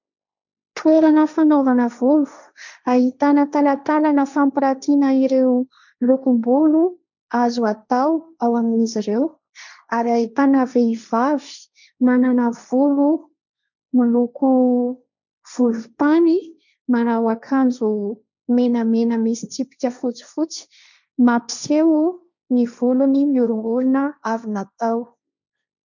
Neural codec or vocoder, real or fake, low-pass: codec, 16 kHz, 1.1 kbps, Voila-Tokenizer; fake; 7.2 kHz